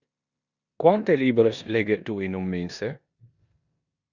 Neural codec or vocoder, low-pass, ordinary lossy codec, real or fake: codec, 16 kHz in and 24 kHz out, 0.9 kbps, LongCat-Audio-Codec, four codebook decoder; 7.2 kHz; Opus, 64 kbps; fake